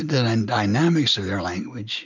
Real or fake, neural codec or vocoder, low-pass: real; none; 7.2 kHz